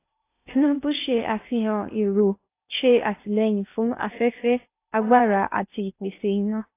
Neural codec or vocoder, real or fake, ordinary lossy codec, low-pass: codec, 16 kHz in and 24 kHz out, 0.8 kbps, FocalCodec, streaming, 65536 codes; fake; AAC, 24 kbps; 3.6 kHz